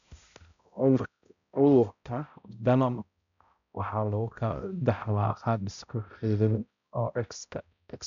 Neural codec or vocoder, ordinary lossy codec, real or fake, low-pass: codec, 16 kHz, 0.5 kbps, X-Codec, HuBERT features, trained on balanced general audio; none; fake; 7.2 kHz